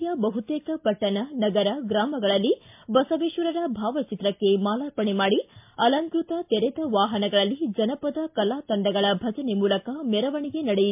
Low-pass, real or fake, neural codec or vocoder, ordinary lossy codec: 3.6 kHz; real; none; MP3, 32 kbps